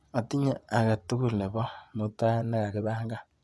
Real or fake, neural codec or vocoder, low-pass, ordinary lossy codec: fake; vocoder, 24 kHz, 100 mel bands, Vocos; none; none